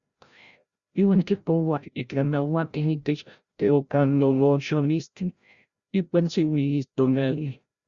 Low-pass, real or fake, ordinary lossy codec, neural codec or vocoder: 7.2 kHz; fake; Opus, 64 kbps; codec, 16 kHz, 0.5 kbps, FreqCodec, larger model